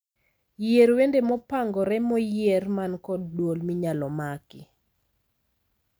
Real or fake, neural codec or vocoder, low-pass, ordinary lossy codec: real; none; none; none